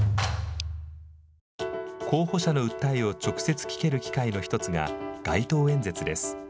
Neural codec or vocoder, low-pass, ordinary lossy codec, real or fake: none; none; none; real